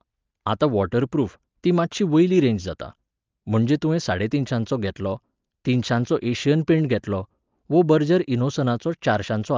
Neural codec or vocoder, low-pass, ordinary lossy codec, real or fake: none; 7.2 kHz; Opus, 24 kbps; real